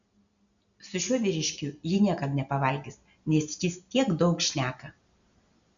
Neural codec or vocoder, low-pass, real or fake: none; 7.2 kHz; real